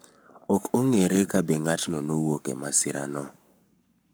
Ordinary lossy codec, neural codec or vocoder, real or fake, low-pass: none; codec, 44.1 kHz, 7.8 kbps, Pupu-Codec; fake; none